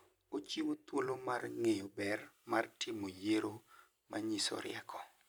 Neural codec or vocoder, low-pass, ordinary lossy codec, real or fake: vocoder, 44.1 kHz, 128 mel bands every 256 samples, BigVGAN v2; none; none; fake